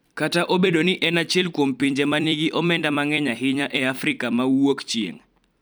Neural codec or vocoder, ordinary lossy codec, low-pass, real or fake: vocoder, 44.1 kHz, 128 mel bands every 256 samples, BigVGAN v2; none; none; fake